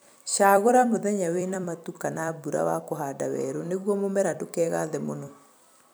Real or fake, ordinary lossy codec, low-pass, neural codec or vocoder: fake; none; none; vocoder, 44.1 kHz, 128 mel bands every 256 samples, BigVGAN v2